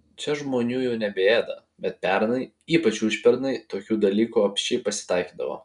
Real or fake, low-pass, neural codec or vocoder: real; 10.8 kHz; none